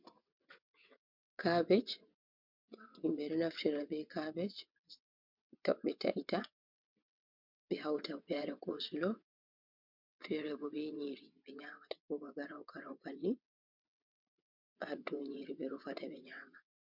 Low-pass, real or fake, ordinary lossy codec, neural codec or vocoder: 5.4 kHz; fake; MP3, 48 kbps; vocoder, 22.05 kHz, 80 mel bands, WaveNeXt